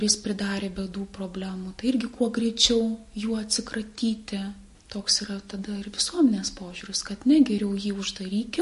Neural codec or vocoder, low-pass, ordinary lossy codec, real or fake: none; 14.4 kHz; MP3, 48 kbps; real